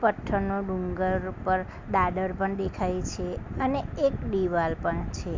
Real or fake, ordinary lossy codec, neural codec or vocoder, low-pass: real; MP3, 64 kbps; none; 7.2 kHz